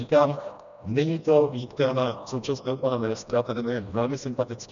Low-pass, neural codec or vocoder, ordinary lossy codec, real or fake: 7.2 kHz; codec, 16 kHz, 1 kbps, FreqCodec, smaller model; MP3, 96 kbps; fake